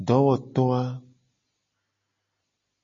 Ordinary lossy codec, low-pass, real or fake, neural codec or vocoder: MP3, 32 kbps; 7.2 kHz; real; none